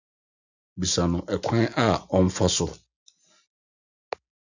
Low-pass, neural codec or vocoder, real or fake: 7.2 kHz; none; real